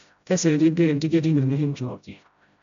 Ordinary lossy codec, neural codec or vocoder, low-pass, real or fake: MP3, 64 kbps; codec, 16 kHz, 0.5 kbps, FreqCodec, smaller model; 7.2 kHz; fake